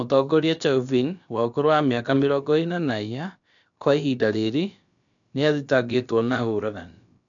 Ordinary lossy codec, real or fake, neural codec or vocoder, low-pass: none; fake; codec, 16 kHz, about 1 kbps, DyCAST, with the encoder's durations; 7.2 kHz